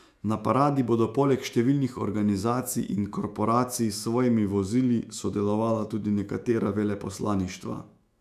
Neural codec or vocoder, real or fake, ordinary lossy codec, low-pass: autoencoder, 48 kHz, 128 numbers a frame, DAC-VAE, trained on Japanese speech; fake; none; 14.4 kHz